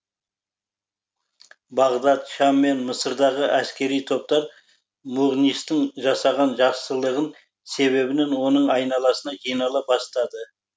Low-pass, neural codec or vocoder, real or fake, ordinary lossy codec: none; none; real; none